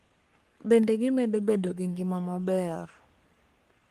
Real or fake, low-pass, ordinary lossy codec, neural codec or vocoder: fake; 14.4 kHz; Opus, 24 kbps; codec, 44.1 kHz, 3.4 kbps, Pupu-Codec